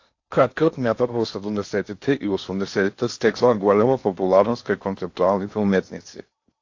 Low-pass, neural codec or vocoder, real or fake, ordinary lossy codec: 7.2 kHz; codec, 16 kHz in and 24 kHz out, 0.8 kbps, FocalCodec, streaming, 65536 codes; fake; AAC, 48 kbps